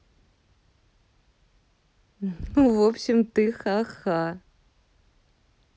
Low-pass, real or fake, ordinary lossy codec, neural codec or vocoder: none; real; none; none